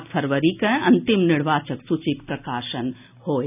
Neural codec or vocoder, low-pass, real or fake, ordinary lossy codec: none; 3.6 kHz; real; none